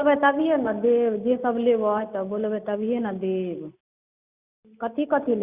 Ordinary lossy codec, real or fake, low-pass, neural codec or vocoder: Opus, 64 kbps; real; 3.6 kHz; none